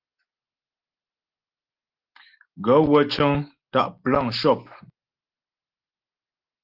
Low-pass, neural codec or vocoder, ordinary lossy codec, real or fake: 5.4 kHz; none; Opus, 16 kbps; real